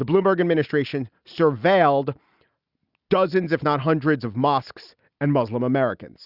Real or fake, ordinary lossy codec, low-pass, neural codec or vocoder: real; Opus, 64 kbps; 5.4 kHz; none